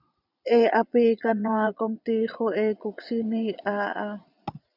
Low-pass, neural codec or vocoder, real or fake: 5.4 kHz; vocoder, 22.05 kHz, 80 mel bands, Vocos; fake